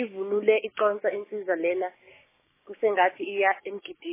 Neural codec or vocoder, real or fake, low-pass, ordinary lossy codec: codec, 16 kHz, 6 kbps, DAC; fake; 3.6 kHz; MP3, 16 kbps